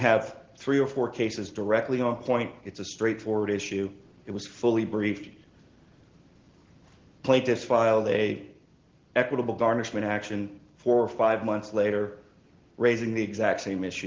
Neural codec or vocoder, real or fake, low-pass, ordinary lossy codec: none; real; 7.2 kHz; Opus, 16 kbps